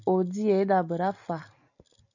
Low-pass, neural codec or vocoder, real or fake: 7.2 kHz; none; real